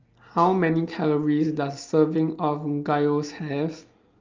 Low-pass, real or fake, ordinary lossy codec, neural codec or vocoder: 7.2 kHz; real; Opus, 32 kbps; none